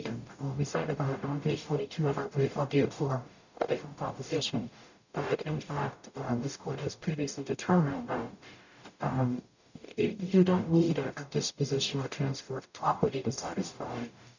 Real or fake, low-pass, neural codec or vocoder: fake; 7.2 kHz; codec, 44.1 kHz, 0.9 kbps, DAC